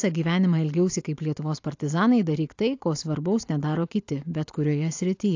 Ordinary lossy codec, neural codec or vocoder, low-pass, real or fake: AAC, 48 kbps; none; 7.2 kHz; real